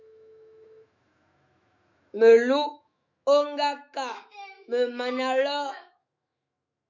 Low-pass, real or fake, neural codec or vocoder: 7.2 kHz; fake; autoencoder, 48 kHz, 128 numbers a frame, DAC-VAE, trained on Japanese speech